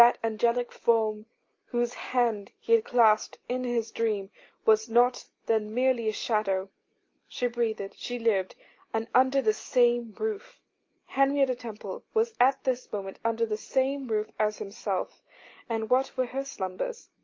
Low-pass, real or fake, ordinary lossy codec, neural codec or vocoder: 7.2 kHz; real; Opus, 24 kbps; none